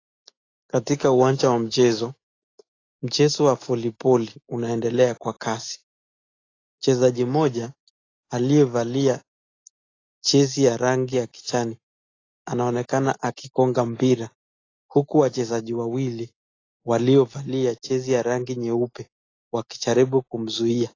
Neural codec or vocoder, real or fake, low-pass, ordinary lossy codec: none; real; 7.2 kHz; AAC, 32 kbps